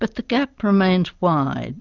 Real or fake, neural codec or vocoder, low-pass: real; none; 7.2 kHz